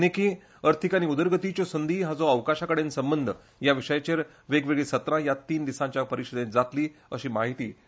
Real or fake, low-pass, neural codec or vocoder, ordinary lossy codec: real; none; none; none